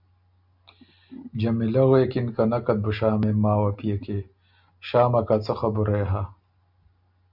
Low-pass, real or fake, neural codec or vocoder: 5.4 kHz; real; none